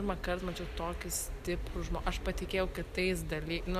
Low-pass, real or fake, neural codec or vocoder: 14.4 kHz; real; none